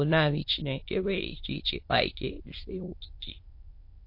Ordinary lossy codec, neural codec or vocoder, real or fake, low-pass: MP3, 32 kbps; autoencoder, 22.05 kHz, a latent of 192 numbers a frame, VITS, trained on many speakers; fake; 5.4 kHz